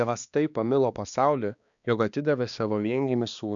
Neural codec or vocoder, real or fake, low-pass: codec, 16 kHz, 2 kbps, X-Codec, HuBERT features, trained on balanced general audio; fake; 7.2 kHz